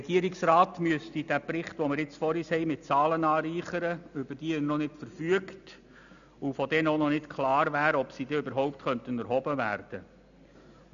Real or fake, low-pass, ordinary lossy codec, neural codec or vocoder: real; 7.2 kHz; none; none